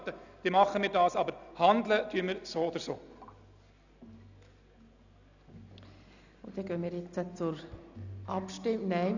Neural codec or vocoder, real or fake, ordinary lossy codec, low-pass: none; real; none; 7.2 kHz